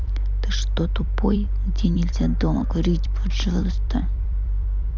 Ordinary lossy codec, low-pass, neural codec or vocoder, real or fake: none; 7.2 kHz; vocoder, 44.1 kHz, 128 mel bands every 256 samples, BigVGAN v2; fake